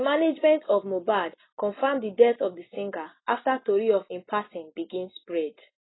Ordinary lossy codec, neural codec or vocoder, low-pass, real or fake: AAC, 16 kbps; none; 7.2 kHz; real